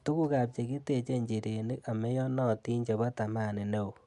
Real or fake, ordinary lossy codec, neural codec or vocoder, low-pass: real; MP3, 96 kbps; none; 10.8 kHz